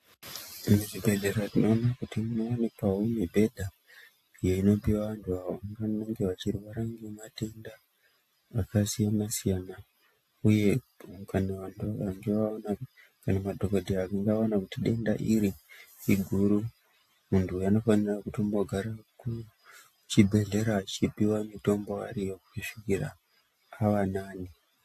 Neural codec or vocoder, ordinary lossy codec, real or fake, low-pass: none; AAC, 64 kbps; real; 14.4 kHz